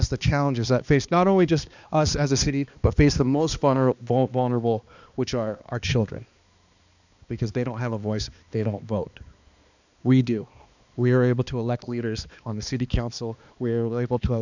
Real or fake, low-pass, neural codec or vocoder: fake; 7.2 kHz; codec, 16 kHz, 2 kbps, X-Codec, HuBERT features, trained on balanced general audio